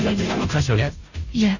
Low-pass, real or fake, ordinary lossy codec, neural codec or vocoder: 7.2 kHz; fake; none; codec, 16 kHz, 0.5 kbps, FunCodec, trained on Chinese and English, 25 frames a second